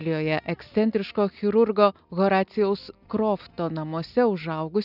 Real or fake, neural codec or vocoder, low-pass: real; none; 5.4 kHz